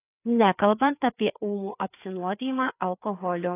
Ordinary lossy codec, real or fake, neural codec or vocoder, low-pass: AAC, 24 kbps; fake; codec, 16 kHz, 4 kbps, FreqCodec, larger model; 3.6 kHz